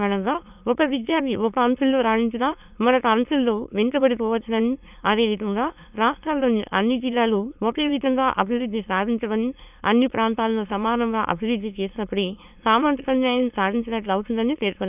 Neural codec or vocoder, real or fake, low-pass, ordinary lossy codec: autoencoder, 22.05 kHz, a latent of 192 numbers a frame, VITS, trained on many speakers; fake; 3.6 kHz; none